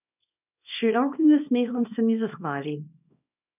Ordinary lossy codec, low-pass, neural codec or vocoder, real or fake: none; 3.6 kHz; codec, 24 kHz, 0.9 kbps, WavTokenizer, small release; fake